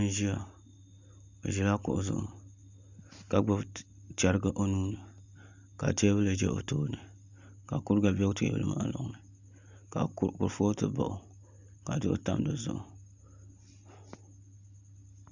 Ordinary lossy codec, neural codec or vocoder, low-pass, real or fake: Opus, 64 kbps; none; 7.2 kHz; real